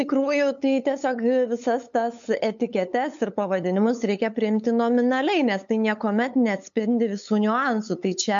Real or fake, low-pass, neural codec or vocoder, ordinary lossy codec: fake; 7.2 kHz; codec, 16 kHz, 8 kbps, FunCodec, trained on LibriTTS, 25 frames a second; MP3, 64 kbps